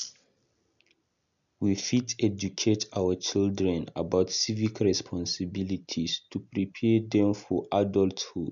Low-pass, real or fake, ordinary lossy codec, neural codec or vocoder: 7.2 kHz; real; none; none